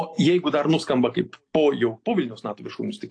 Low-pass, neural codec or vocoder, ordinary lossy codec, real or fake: 9.9 kHz; none; AAC, 48 kbps; real